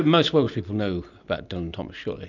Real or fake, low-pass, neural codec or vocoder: real; 7.2 kHz; none